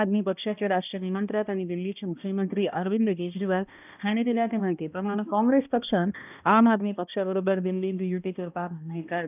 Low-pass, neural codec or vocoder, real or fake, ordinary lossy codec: 3.6 kHz; codec, 16 kHz, 1 kbps, X-Codec, HuBERT features, trained on balanced general audio; fake; none